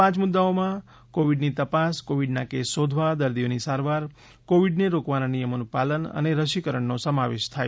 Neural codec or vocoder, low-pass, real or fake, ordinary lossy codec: none; none; real; none